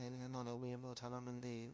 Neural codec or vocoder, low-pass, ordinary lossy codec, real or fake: codec, 16 kHz, 0.5 kbps, FunCodec, trained on LibriTTS, 25 frames a second; none; none; fake